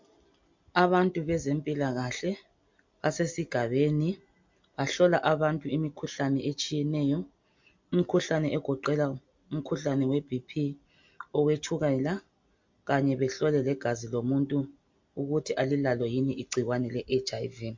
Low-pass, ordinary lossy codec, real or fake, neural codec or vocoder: 7.2 kHz; MP3, 48 kbps; real; none